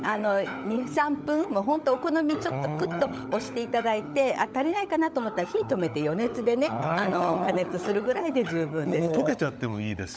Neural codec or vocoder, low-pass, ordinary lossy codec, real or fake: codec, 16 kHz, 16 kbps, FunCodec, trained on LibriTTS, 50 frames a second; none; none; fake